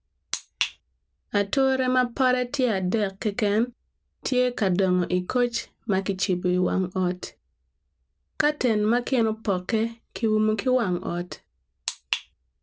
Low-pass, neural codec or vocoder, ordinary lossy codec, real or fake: none; none; none; real